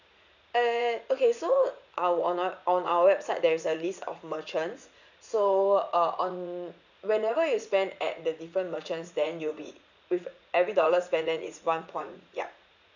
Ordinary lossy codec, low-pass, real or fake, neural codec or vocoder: none; 7.2 kHz; fake; vocoder, 44.1 kHz, 80 mel bands, Vocos